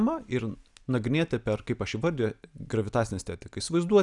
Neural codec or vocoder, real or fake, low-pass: none; real; 10.8 kHz